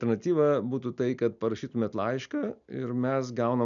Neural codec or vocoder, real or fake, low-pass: none; real; 7.2 kHz